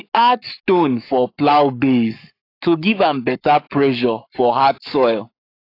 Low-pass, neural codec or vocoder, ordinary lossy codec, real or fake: 5.4 kHz; codec, 44.1 kHz, 7.8 kbps, Pupu-Codec; AAC, 32 kbps; fake